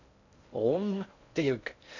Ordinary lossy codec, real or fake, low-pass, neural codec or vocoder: none; fake; 7.2 kHz; codec, 16 kHz in and 24 kHz out, 0.6 kbps, FocalCodec, streaming, 2048 codes